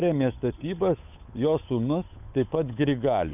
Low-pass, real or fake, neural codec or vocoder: 3.6 kHz; fake; codec, 16 kHz, 8 kbps, FunCodec, trained on Chinese and English, 25 frames a second